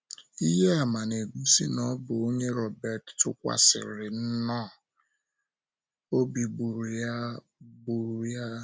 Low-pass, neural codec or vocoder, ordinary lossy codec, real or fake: none; none; none; real